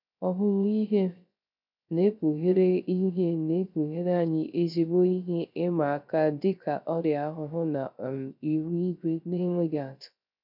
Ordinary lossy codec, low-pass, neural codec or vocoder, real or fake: none; 5.4 kHz; codec, 16 kHz, 0.3 kbps, FocalCodec; fake